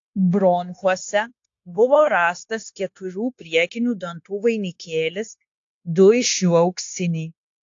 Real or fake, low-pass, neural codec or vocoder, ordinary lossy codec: fake; 7.2 kHz; codec, 16 kHz, 0.9 kbps, LongCat-Audio-Codec; AAC, 48 kbps